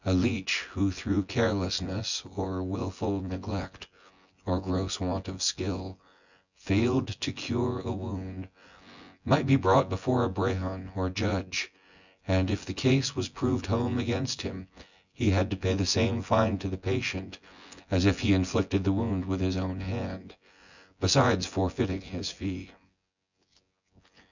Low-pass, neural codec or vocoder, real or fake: 7.2 kHz; vocoder, 24 kHz, 100 mel bands, Vocos; fake